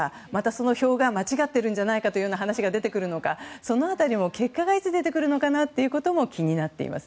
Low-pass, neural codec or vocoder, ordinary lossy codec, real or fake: none; none; none; real